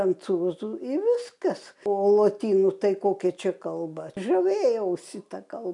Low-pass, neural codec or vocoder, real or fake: 10.8 kHz; none; real